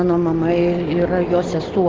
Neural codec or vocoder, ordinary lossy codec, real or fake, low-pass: none; Opus, 16 kbps; real; 7.2 kHz